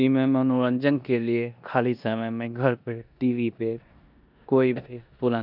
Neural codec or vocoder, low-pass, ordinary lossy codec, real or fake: codec, 16 kHz in and 24 kHz out, 0.9 kbps, LongCat-Audio-Codec, four codebook decoder; 5.4 kHz; none; fake